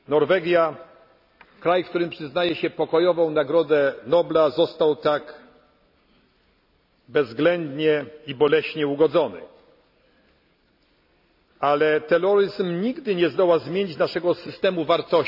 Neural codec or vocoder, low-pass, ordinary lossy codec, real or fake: none; 5.4 kHz; none; real